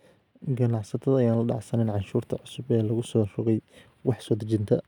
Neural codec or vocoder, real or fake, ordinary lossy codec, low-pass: none; real; none; 19.8 kHz